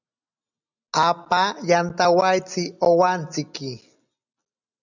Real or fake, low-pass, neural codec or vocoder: real; 7.2 kHz; none